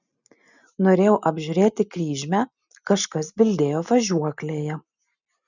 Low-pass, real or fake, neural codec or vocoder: 7.2 kHz; real; none